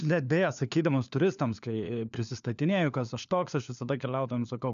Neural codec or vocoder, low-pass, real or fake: codec, 16 kHz, 4 kbps, FunCodec, trained on LibriTTS, 50 frames a second; 7.2 kHz; fake